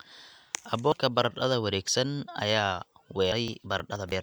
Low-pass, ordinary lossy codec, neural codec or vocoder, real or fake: none; none; none; real